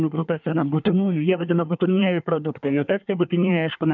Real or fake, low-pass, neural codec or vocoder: fake; 7.2 kHz; codec, 24 kHz, 1 kbps, SNAC